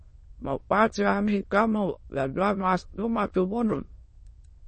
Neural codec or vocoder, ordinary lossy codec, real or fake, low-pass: autoencoder, 22.05 kHz, a latent of 192 numbers a frame, VITS, trained on many speakers; MP3, 32 kbps; fake; 9.9 kHz